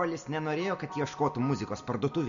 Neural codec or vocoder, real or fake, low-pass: none; real; 7.2 kHz